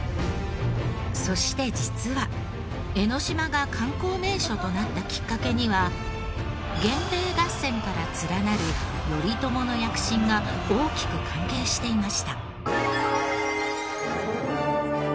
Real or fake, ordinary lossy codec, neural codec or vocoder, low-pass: real; none; none; none